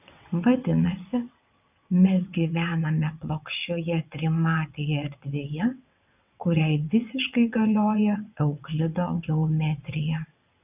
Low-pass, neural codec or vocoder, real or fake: 3.6 kHz; vocoder, 22.05 kHz, 80 mel bands, WaveNeXt; fake